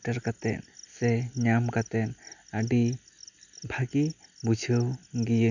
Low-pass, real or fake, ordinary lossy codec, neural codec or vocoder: 7.2 kHz; real; none; none